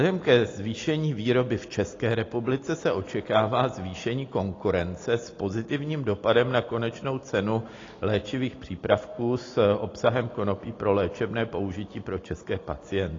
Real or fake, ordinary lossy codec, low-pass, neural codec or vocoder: real; AAC, 32 kbps; 7.2 kHz; none